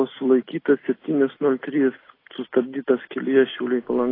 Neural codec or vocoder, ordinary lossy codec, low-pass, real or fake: none; AAC, 24 kbps; 5.4 kHz; real